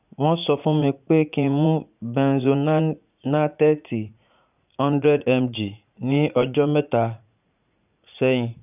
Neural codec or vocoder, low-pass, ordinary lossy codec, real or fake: vocoder, 44.1 kHz, 80 mel bands, Vocos; 3.6 kHz; none; fake